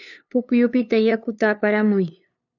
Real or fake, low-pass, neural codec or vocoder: fake; 7.2 kHz; codec, 16 kHz, 2 kbps, FunCodec, trained on LibriTTS, 25 frames a second